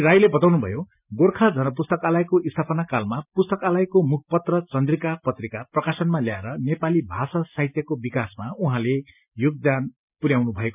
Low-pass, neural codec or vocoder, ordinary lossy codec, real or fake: 3.6 kHz; none; none; real